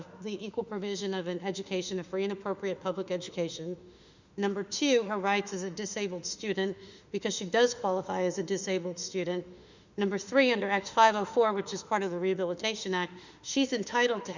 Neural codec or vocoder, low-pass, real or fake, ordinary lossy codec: autoencoder, 48 kHz, 32 numbers a frame, DAC-VAE, trained on Japanese speech; 7.2 kHz; fake; Opus, 64 kbps